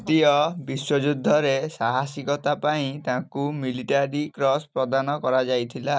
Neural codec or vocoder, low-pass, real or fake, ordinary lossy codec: none; none; real; none